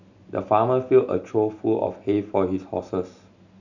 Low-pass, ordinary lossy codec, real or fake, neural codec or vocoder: 7.2 kHz; none; real; none